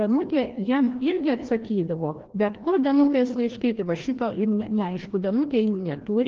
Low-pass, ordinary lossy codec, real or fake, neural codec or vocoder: 7.2 kHz; Opus, 16 kbps; fake; codec, 16 kHz, 1 kbps, FreqCodec, larger model